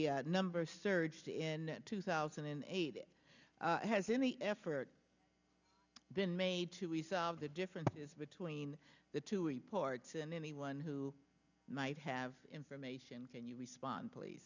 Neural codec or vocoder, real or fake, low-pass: none; real; 7.2 kHz